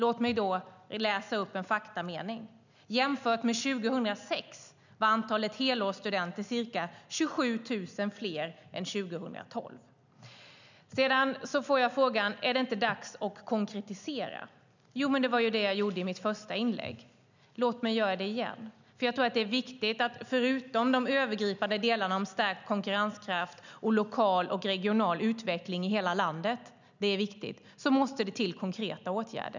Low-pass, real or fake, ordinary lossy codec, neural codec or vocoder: 7.2 kHz; real; none; none